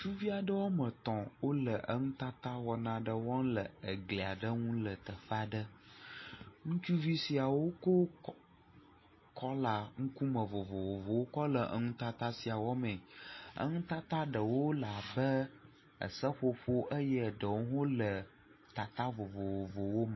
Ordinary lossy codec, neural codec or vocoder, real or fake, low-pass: MP3, 24 kbps; none; real; 7.2 kHz